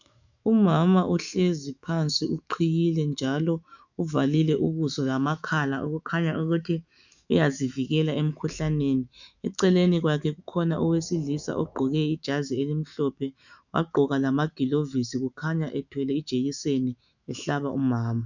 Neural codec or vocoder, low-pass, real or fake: autoencoder, 48 kHz, 128 numbers a frame, DAC-VAE, trained on Japanese speech; 7.2 kHz; fake